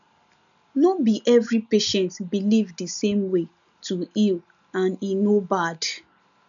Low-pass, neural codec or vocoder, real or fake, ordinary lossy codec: 7.2 kHz; none; real; none